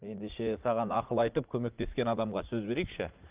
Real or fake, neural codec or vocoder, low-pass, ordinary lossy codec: fake; vocoder, 44.1 kHz, 80 mel bands, Vocos; 3.6 kHz; Opus, 24 kbps